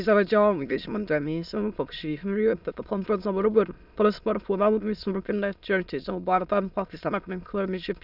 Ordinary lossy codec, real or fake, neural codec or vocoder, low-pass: none; fake; autoencoder, 22.05 kHz, a latent of 192 numbers a frame, VITS, trained on many speakers; 5.4 kHz